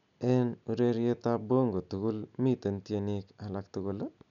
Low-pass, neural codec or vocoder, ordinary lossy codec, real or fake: 7.2 kHz; none; none; real